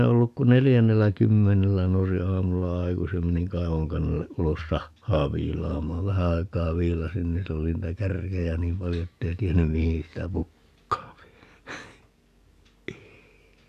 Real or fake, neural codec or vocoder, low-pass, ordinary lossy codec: fake; codec, 44.1 kHz, 7.8 kbps, DAC; 14.4 kHz; none